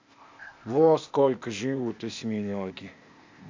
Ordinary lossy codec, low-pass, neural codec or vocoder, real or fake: MP3, 48 kbps; 7.2 kHz; codec, 16 kHz, 0.8 kbps, ZipCodec; fake